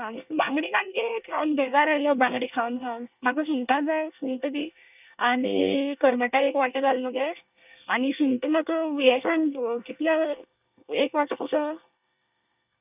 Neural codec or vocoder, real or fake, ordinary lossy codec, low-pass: codec, 24 kHz, 1 kbps, SNAC; fake; none; 3.6 kHz